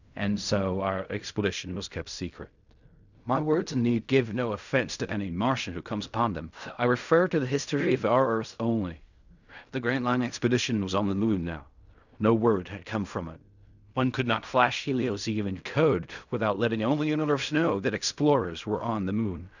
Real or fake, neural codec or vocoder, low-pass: fake; codec, 16 kHz in and 24 kHz out, 0.4 kbps, LongCat-Audio-Codec, fine tuned four codebook decoder; 7.2 kHz